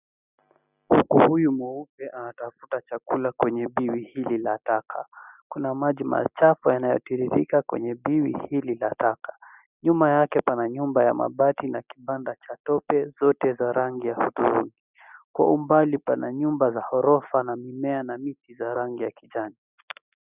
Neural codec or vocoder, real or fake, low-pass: none; real; 3.6 kHz